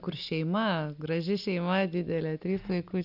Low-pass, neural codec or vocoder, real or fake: 5.4 kHz; none; real